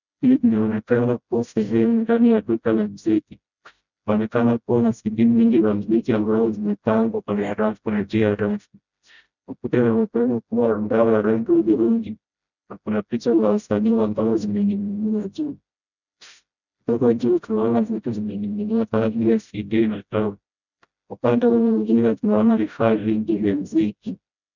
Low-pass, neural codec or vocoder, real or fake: 7.2 kHz; codec, 16 kHz, 0.5 kbps, FreqCodec, smaller model; fake